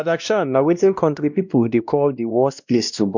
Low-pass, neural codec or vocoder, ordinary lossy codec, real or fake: 7.2 kHz; codec, 16 kHz, 1 kbps, X-Codec, WavLM features, trained on Multilingual LibriSpeech; none; fake